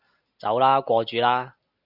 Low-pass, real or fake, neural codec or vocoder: 5.4 kHz; real; none